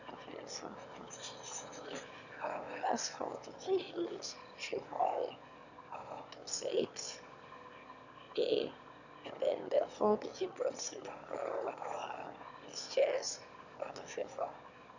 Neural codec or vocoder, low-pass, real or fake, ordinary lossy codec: autoencoder, 22.05 kHz, a latent of 192 numbers a frame, VITS, trained on one speaker; 7.2 kHz; fake; none